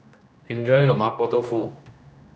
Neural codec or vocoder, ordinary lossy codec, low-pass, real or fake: codec, 16 kHz, 1 kbps, X-Codec, HuBERT features, trained on general audio; none; none; fake